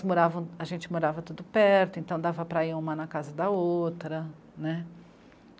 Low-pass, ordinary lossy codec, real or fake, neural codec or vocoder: none; none; real; none